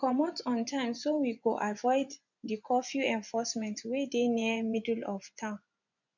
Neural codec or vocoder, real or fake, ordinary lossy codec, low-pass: vocoder, 24 kHz, 100 mel bands, Vocos; fake; none; 7.2 kHz